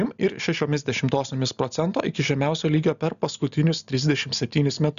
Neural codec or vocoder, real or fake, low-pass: none; real; 7.2 kHz